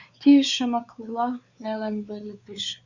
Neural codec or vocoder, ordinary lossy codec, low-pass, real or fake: codec, 16 kHz, 4 kbps, X-Codec, WavLM features, trained on Multilingual LibriSpeech; Opus, 64 kbps; 7.2 kHz; fake